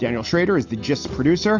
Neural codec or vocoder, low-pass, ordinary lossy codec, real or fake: none; 7.2 kHz; MP3, 48 kbps; real